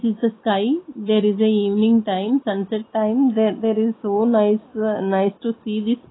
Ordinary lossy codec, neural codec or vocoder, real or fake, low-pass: AAC, 16 kbps; none; real; 7.2 kHz